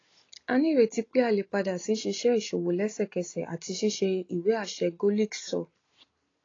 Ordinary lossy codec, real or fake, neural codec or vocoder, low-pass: AAC, 32 kbps; real; none; 7.2 kHz